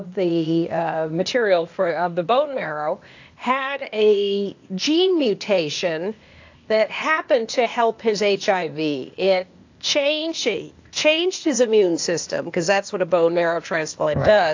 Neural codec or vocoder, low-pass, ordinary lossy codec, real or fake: codec, 16 kHz, 0.8 kbps, ZipCodec; 7.2 kHz; AAC, 48 kbps; fake